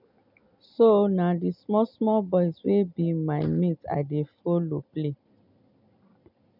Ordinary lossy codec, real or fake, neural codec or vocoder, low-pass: none; real; none; 5.4 kHz